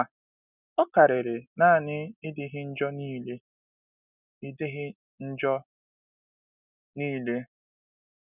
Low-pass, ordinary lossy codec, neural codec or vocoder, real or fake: 3.6 kHz; none; none; real